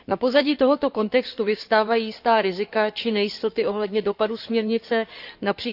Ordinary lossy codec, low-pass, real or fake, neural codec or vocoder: none; 5.4 kHz; fake; codec, 16 kHz in and 24 kHz out, 2.2 kbps, FireRedTTS-2 codec